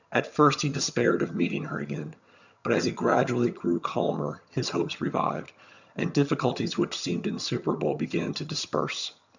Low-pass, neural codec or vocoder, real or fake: 7.2 kHz; vocoder, 22.05 kHz, 80 mel bands, HiFi-GAN; fake